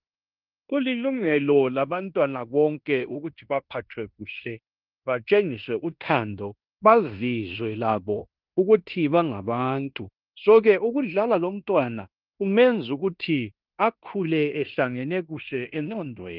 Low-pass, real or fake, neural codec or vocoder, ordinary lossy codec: 5.4 kHz; fake; codec, 16 kHz in and 24 kHz out, 0.9 kbps, LongCat-Audio-Codec, fine tuned four codebook decoder; Opus, 24 kbps